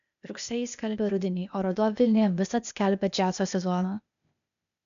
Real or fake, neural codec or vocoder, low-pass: fake; codec, 16 kHz, 0.8 kbps, ZipCodec; 7.2 kHz